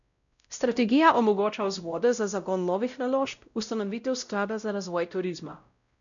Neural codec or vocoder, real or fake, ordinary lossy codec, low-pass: codec, 16 kHz, 0.5 kbps, X-Codec, WavLM features, trained on Multilingual LibriSpeech; fake; none; 7.2 kHz